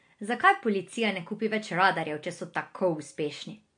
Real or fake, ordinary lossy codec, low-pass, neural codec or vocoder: real; MP3, 48 kbps; 9.9 kHz; none